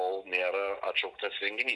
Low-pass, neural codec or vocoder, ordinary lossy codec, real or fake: 10.8 kHz; none; MP3, 96 kbps; real